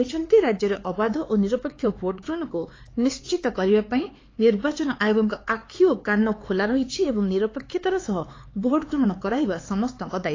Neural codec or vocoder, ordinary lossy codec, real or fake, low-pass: codec, 16 kHz, 4 kbps, X-Codec, HuBERT features, trained on LibriSpeech; AAC, 32 kbps; fake; 7.2 kHz